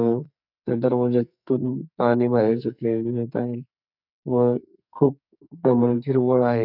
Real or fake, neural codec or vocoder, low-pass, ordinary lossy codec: fake; codec, 44.1 kHz, 2.6 kbps, SNAC; 5.4 kHz; none